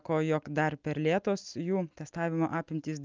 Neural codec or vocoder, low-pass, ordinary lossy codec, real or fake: none; 7.2 kHz; Opus, 24 kbps; real